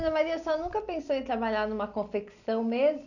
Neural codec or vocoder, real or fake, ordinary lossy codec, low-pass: none; real; none; 7.2 kHz